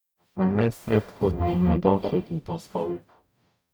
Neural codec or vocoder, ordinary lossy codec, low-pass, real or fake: codec, 44.1 kHz, 0.9 kbps, DAC; none; none; fake